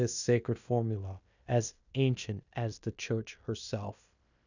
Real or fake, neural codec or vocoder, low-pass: fake; codec, 16 kHz in and 24 kHz out, 0.9 kbps, LongCat-Audio-Codec, fine tuned four codebook decoder; 7.2 kHz